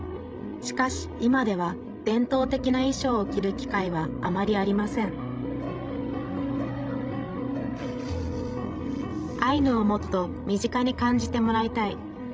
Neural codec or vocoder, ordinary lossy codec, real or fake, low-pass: codec, 16 kHz, 16 kbps, FreqCodec, larger model; none; fake; none